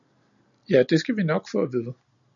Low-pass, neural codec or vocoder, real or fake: 7.2 kHz; none; real